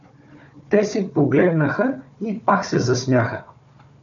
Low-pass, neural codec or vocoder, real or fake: 7.2 kHz; codec, 16 kHz, 4 kbps, FunCodec, trained on Chinese and English, 50 frames a second; fake